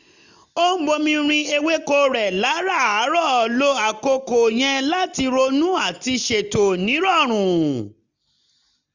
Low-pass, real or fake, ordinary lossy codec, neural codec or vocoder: 7.2 kHz; real; none; none